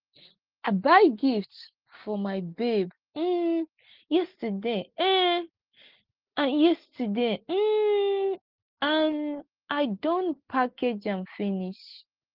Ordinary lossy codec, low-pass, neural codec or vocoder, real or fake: Opus, 16 kbps; 5.4 kHz; none; real